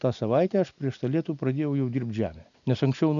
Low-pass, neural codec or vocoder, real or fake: 7.2 kHz; none; real